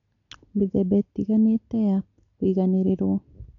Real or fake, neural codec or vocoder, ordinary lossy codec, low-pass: real; none; none; 7.2 kHz